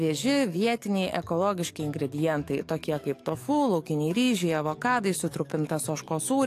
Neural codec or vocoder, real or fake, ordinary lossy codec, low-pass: codec, 44.1 kHz, 7.8 kbps, DAC; fake; AAC, 64 kbps; 14.4 kHz